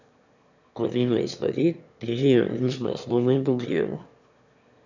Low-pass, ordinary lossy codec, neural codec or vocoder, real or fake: 7.2 kHz; none; autoencoder, 22.05 kHz, a latent of 192 numbers a frame, VITS, trained on one speaker; fake